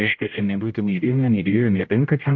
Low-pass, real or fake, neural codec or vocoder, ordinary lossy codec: 7.2 kHz; fake; codec, 16 kHz, 0.5 kbps, X-Codec, HuBERT features, trained on general audio; MP3, 64 kbps